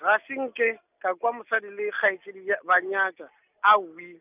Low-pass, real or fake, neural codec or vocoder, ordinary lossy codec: 3.6 kHz; real; none; none